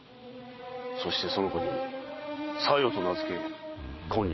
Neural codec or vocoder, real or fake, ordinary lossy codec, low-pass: vocoder, 22.05 kHz, 80 mel bands, Vocos; fake; MP3, 24 kbps; 7.2 kHz